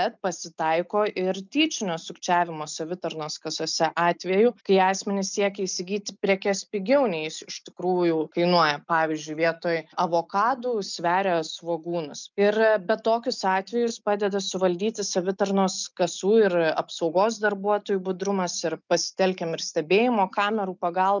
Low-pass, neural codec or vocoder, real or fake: 7.2 kHz; none; real